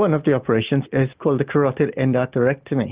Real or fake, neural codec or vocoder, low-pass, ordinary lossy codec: real; none; 3.6 kHz; Opus, 32 kbps